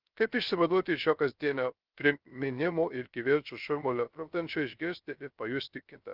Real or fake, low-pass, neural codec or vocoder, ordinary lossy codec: fake; 5.4 kHz; codec, 16 kHz, 0.3 kbps, FocalCodec; Opus, 24 kbps